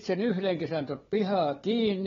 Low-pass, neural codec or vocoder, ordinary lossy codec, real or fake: 7.2 kHz; codec, 16 kHz, 4 kbps, FunCodec, trained on Chinese and English, 50 frames a second; AAC, 24 kbps; fake